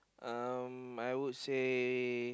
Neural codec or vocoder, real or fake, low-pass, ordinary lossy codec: none; real; none; none